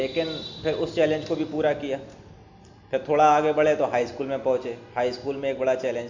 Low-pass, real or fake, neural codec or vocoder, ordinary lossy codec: 7.2 kHz; real; none; MP3, 64 kbps